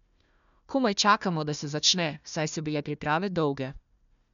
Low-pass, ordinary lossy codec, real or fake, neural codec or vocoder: 7.2 kHz; none; fake; codec, 16 kHz, 1 kbps, FunCodec, trained on Chinese and English, 50 frames a second